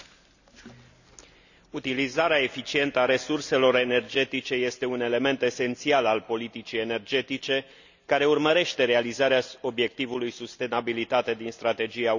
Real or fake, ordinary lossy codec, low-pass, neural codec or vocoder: real; none; 7.2 kHz; none